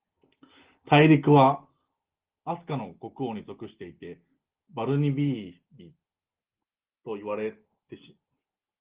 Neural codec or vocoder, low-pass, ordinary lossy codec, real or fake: none; 3.6 kHz; Opus, 16 kbps; real